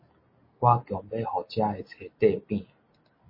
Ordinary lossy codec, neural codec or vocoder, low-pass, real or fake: MP3, 24 kbps; none; 5.4 kHz; real